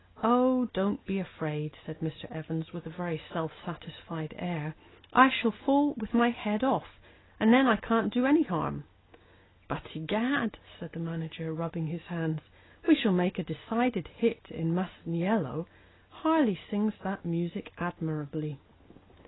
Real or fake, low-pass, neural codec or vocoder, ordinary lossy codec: real; 7.2 kHz; none; AAC, 16 kbps